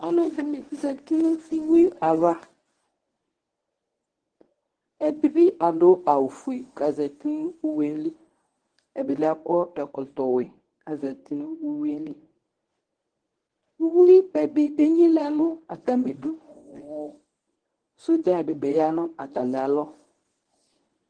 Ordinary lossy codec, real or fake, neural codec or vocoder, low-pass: Opus, 16 kbps; fake; codec, 24 kHz, 0.9 kbps, WavTokenizer, medium speech release version 1; 9.9 kHz